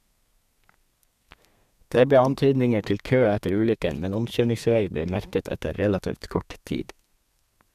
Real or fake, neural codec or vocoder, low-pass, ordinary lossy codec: fake; codec, 32 kHz, 1.9 kbps, SNAC; 14.4 kHz; none